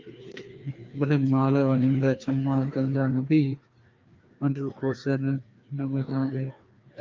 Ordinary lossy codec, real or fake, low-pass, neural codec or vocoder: Opus, 24 kbps; fake; 7.2 kHz; codec, 16 kHz, 2 kbps, FreqCodec, larger model